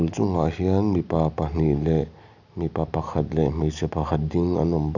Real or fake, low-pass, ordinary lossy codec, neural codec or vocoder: real; 7.2 kHz; none; none